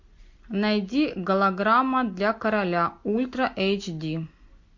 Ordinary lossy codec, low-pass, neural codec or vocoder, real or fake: MP3, 48 kbps; 7.2 kHz; none; real